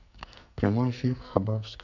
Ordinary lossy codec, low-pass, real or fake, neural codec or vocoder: none; 7.2 kHz; fake; codec, 44.1 kHz, 2.6 kbps, SNAC